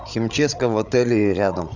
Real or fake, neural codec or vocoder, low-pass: fake; codec, 16 kHz, 16 kbps, FreqCodec, larger model; 7.2 kHz